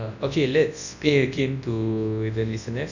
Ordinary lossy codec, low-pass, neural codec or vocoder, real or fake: none; 7.2 kHz; codec, 24 kHz, 0.9 kbps, WavTokenizer, large speech release; fake